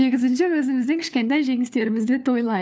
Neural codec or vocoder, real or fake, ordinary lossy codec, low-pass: codec, 16 kHz, 4 kbps, FunCodec, trained on LibriTTS, 50 frames a second; fake; none; none